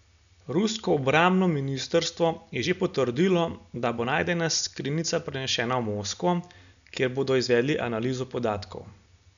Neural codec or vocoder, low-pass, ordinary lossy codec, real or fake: none; 7.2 kHz; none; real